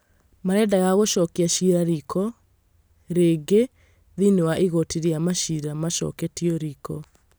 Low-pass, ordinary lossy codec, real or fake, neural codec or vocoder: none; none; real; none